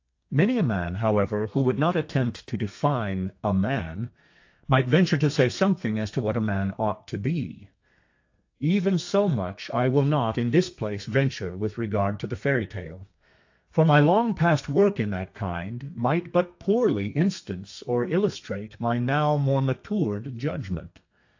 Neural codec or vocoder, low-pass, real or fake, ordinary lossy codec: codec, 32 kHz, 1.9 kbps, SNAC; 7.2 kHz; fake; AAC, 48 kbps